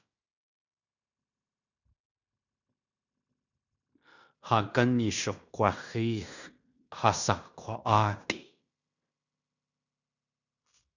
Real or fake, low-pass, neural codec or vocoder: fake; 7.2 kHz; codec, 16 kHz in and 24 kHz out, 0.9 kbps, LongCat-Audio-Codec, fine tuned four codebook decoder